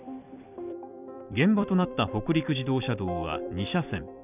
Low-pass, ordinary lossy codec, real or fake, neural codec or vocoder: 3.6 kHz; none; fake; vocoder, 44.1 kHz, 128 mel bands every 256 samples, BigVGAN v2